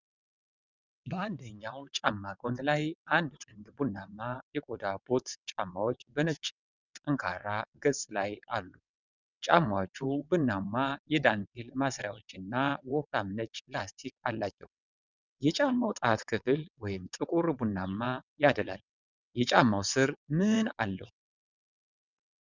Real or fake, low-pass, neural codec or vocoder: fake; 7.2 kHz; vocoder, 22.05 kHz, 80 mel bands, WaveNeXt